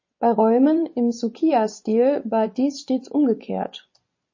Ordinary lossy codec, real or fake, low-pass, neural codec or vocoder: MP3, 32 kbps; fake; 7.2 kHz; vocoder, 24 kHz, 100 mel bands, Vocos